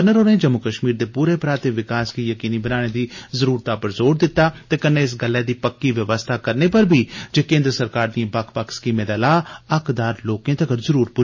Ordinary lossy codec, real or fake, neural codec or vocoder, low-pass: MP3, 32 kbps; real; none; 7.2 kHz